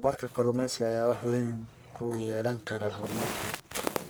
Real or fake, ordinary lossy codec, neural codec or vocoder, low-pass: fake; none; codec, 44.1 kHz, 1.7 kbps, Pupu-Codec; none